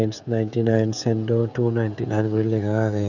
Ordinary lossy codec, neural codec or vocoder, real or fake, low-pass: none; codec, 16 kHz, 16 kbps, FreqCodec, smaller model; fake; 7.2 kHz